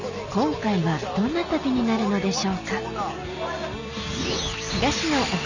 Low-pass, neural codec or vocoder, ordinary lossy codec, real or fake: 7.2 kHz; none; none; real